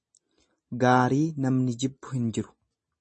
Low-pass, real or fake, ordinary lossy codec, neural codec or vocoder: 9.9 kHz; real; MP3, 32 kbps; none